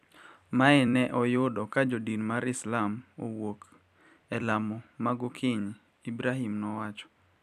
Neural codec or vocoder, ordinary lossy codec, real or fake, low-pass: vocoder, 44.1 kHz, 128 mel bands every 256 samples, BigVGAN v2; none; fake; 14.4 kHz